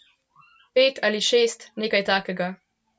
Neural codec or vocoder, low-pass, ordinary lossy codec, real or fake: none; none; none; real